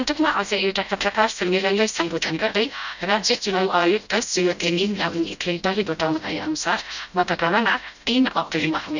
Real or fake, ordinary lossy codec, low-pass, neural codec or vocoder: fake; none; 7.2 kHz; codec, 16 kHz, 0.5 kbps, FreqCodec, smaller model